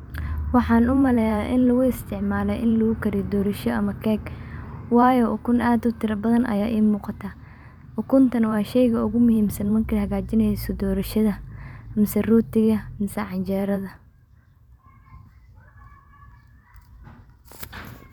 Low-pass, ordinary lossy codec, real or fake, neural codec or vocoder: 19.8 kHz; none; fake; vocoder, 44.1 kHz, 128 mel bands every 512 samples, BigVGAN v2